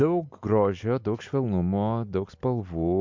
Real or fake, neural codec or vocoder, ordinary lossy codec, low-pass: real; none; MP3, 64 kbps; 7.2 kHz